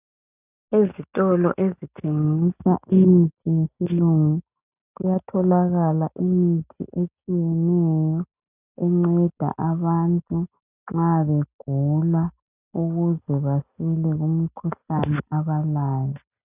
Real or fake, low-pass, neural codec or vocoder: real; 3.6 kHz; none